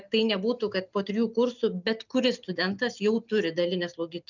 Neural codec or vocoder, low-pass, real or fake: none; 7.2 kHz; real